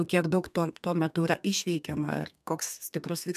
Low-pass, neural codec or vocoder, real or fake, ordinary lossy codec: 14.4 kHz; codec, 32 kHz, 1.9 kbps, SNAC; fake; MP3, 96 kbps